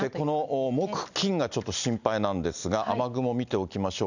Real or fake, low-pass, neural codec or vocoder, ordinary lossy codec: real; 7.2 kHz; none; none